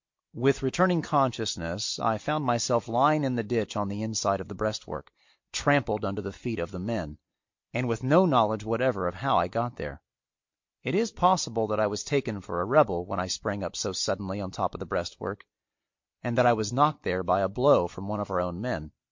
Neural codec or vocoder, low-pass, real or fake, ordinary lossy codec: none; 7.2 kHz; real; MP3, 48 kbps